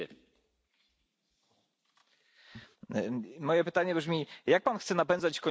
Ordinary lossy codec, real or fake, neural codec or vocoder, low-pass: none; real; none; none